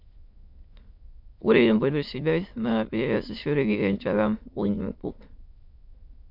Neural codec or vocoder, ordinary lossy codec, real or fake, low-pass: autoencoder, 22.05 kHz, a latent of 192 numbers a frame, VITS, trained on many speakers; MP3, 48 kbps; fake; 5.4 kHz